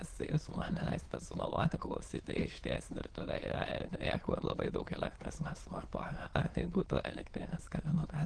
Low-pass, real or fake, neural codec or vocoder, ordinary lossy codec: 9.9 kHz; fake; autoencoder, 22.05 kHz, a latent of 192 numbers a frame, VITS, trained on many speakers; Opus, 16 kbps